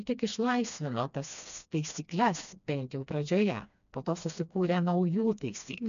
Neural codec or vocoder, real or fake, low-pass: codec, 16 kHz, 2 kbps, FreqCodec, smaller model; fake; 7.2 kHz